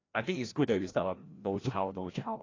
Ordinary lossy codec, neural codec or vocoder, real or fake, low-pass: AAC, 32 kbps; codec, 16 kHz, 1 kbps, FreqCodec, larger model; fake; 7.2 kHz